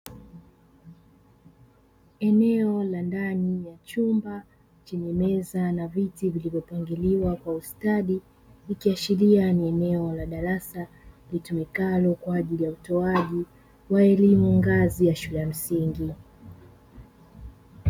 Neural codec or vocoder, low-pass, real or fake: none; 19.8 kHz; real